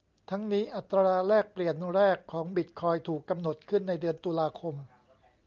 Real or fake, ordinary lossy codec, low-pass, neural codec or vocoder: real; Opus, 24 kbps; 7.2 kHz; none